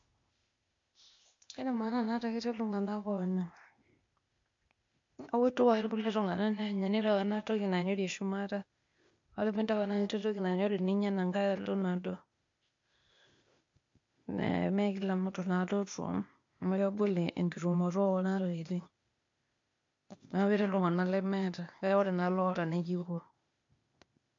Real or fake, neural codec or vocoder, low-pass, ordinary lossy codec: fake; codec, 16 kHz, 0.8 kbps, ZipCodec; 7.2 kHz; MP3, 48 kbps